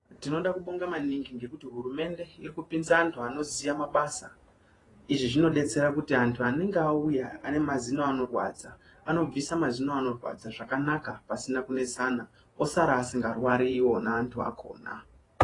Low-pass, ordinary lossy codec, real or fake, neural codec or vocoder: 10.8 kHz; AAC, 32 kbps; fake; vocoder, 48 kHz, 128 mel bands, Vocos